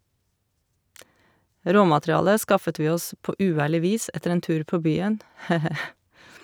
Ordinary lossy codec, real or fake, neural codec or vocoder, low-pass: none; real; none; none